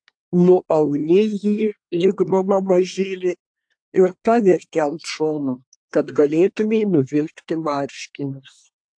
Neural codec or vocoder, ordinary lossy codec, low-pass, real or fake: codec, 24 kHz, 1 kbps, SNAC; AAC, 64 kbps; 9.9 kHz; fake